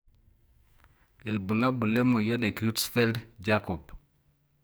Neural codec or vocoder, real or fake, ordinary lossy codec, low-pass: codec, 44.1 kHz, 2.6 kbps, SNAC; fake; none; none